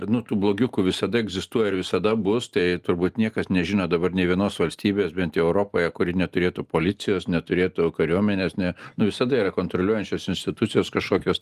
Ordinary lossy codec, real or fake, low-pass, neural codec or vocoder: Opus, 32 kbps; real; 14.4 kHz; none